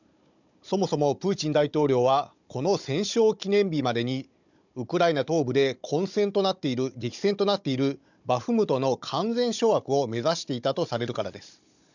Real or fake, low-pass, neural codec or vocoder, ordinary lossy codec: fake; 7.2 kHz; codec, 16 kHz, 16 kbps, FunCodec, trained on Chinese and English, 50 frames a second; none